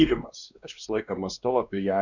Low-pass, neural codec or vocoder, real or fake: 7.2 kHz; codec, 16 kHz, 2 kbps, X-Codec, WavLM features, trained on Multilingual LibriSpeech; fake